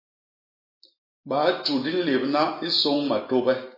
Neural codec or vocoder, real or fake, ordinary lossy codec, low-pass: none; real; MP3, 24 kbps; 5.4 kHz